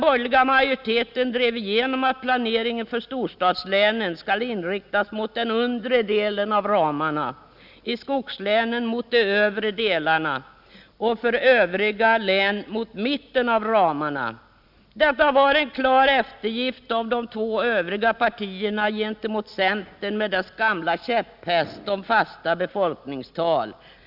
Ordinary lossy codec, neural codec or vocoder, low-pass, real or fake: none; none; 5.4 kHz; real